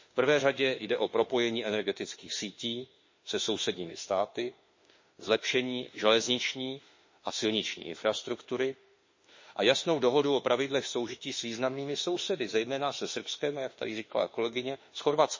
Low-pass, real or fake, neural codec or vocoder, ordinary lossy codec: 7.2 kHz; fake; autoencoder, 48 kHz, 32 numbers a frame, DAC-VAE, trained on Japanese speech; MP3, 32 kbps